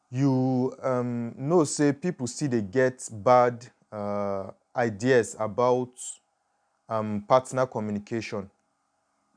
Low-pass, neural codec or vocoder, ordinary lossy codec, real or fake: 9.9 kHz; none; none; real